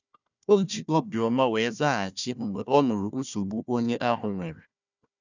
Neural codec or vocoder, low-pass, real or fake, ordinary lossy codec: codec, 16 kHz, 1 kbps, FunCodec, trained on Chinese and English, 50 frames a second; 7.2 kHz; fake; none